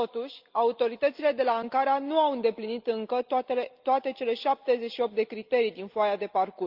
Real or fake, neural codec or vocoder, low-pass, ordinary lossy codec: real; none; 5.4 kHz; Opus, 24 kbps